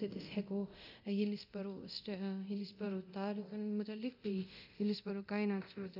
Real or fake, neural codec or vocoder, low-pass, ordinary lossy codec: fake; codec, 24 kHz, 0.9 kbps, DualCodec; 5.4 kHz; none